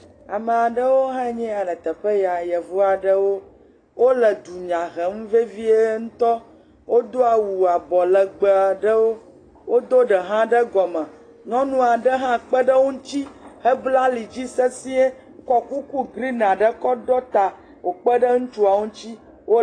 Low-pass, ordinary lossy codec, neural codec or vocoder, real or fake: 9.9 kHz; AAC, 32 kbps; none; real